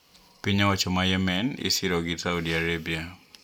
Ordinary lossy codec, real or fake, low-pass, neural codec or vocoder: none; real; 19.8 kHz; none